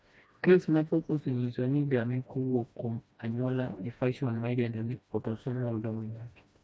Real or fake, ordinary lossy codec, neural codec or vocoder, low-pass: fake; none; codec, 16 kHz, 1 kbps, FreqCodec, smaller model; none